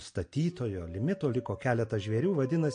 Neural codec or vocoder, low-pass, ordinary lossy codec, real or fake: none; 9.9 kHz; MP3, 48 kbps; real